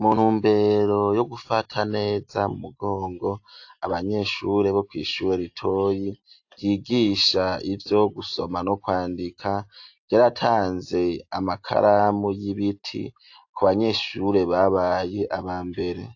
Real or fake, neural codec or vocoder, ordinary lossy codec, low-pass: real; none; AAC, 48 kbps; 7.2 kHz